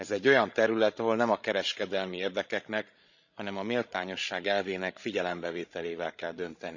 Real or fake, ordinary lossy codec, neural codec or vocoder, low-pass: fake; none; codec, 16 kHz, 16 kbps, FreqCodec, larger model; 7.2 kHz